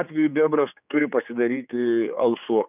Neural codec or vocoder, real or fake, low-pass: codec, 16 kHz, 4 kbps, X-Codec, HuBERT features, trained on general audio; fake; 3.6 kHz